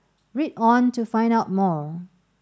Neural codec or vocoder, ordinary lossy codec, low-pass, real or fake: none; none; none; real